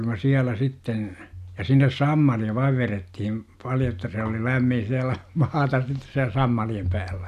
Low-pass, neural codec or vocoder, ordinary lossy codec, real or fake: 14.4 kHz; none; none; real